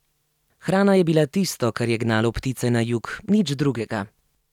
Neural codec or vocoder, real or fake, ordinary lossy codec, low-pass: none; real; none; 19.8 kHz